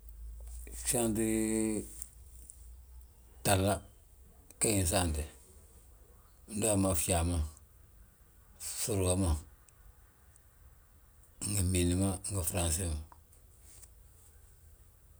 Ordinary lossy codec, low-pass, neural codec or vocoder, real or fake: none; none; none; real